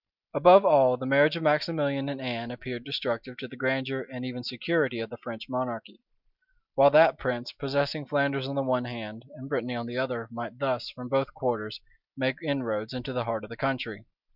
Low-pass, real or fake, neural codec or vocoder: 5.4 kHz; real; none